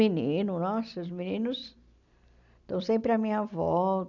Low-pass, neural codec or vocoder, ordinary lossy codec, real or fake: 7.2 kHz; none; none; real